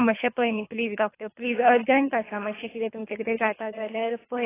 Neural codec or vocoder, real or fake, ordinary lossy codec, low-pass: codec, 24 kHz, 3 kbps, HILCodec; fake; AAC, 16 kbps; 3.6 kHz